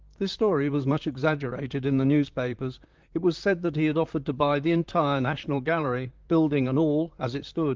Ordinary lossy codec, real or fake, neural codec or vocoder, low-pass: Opus, 32 kbps; fake; vocoder, 44.1 kHz, 128 mel bands, Pupu-Vocoder; 7.2 kHz